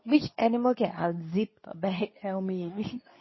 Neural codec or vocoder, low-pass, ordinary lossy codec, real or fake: codec, 24 kHz, 0.9 kbps, WavTokenizer, medium speech release version 2; 7.2 kHz; MP3, 24 kbps; fake